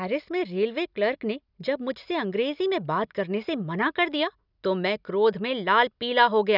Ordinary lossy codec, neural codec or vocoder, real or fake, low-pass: none; none; real; 5.4 kHz